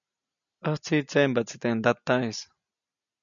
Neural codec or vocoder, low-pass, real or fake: none; 7.2 kHz; real